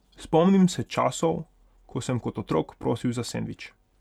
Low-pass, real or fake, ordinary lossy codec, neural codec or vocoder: 19.8 kHz; real; none; none